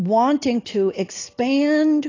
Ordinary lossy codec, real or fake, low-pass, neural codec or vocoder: AAC, 32 kbps; real; 7.2 kHz; none